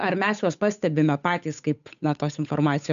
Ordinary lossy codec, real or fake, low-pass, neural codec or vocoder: MP3, 96 kbps; fake; 7.2 kHz; codec, 16 kHz, 8 kbps, FunCodec, trained on Chinese and English, 25 frames a second